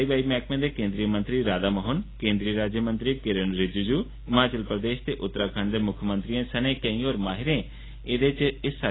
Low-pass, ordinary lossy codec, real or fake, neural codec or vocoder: 7.2 kHz; AAC, 16 kbps; real; none